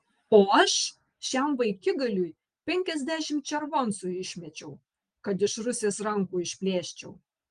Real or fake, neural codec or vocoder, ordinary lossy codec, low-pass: real; none; Opus, 24 kbps; 9.9 kHz